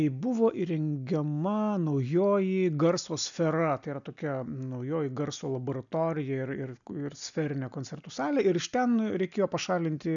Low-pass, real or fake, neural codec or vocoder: 7.2 kHz; real; none